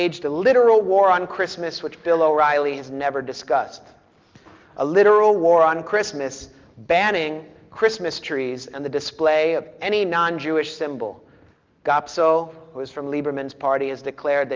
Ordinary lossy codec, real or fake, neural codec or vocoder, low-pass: Opus, 32 kbps; real; none; 7.2 kHz